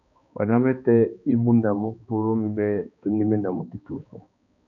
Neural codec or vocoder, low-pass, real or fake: codec, 16 kHz, 2 kbps, X-Codec, HuBERT features, trained on balanced general audio; 7.2 kHz; fake